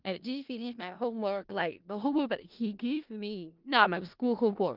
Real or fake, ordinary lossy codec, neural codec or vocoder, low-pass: fake; Opus, 32 kbps; codec, 16 kHz in and 24 kHz out, 0.4 kbps, LongCat-Audio-Codec, four codebook decoder; 5.4 kHz